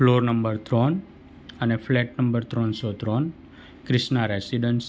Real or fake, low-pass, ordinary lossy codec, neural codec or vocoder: real; none; none; none